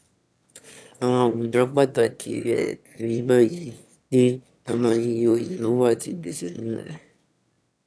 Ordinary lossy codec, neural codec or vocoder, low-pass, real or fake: none; autoencoder, 22.05 kHz, a latent of 192 numbers a frame, VITS, trained on one speaker; none; fake